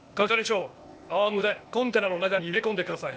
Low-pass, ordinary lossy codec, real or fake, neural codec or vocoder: none; none; fake; codec, 16 kHz, 0.8 kbps, ZipCodec